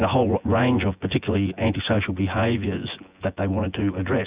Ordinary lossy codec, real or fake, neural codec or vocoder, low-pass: Opus, 64 kbps; fake; vocoder, 24 kHz, 100 mel bands, Vocos; 3.6 kHz